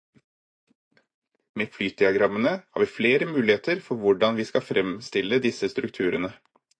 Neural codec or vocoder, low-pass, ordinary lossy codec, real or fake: vocoder, 24 kHz, 100 mel bands, Vocos; 9.9 kHz; AAC, 48 kbps; fake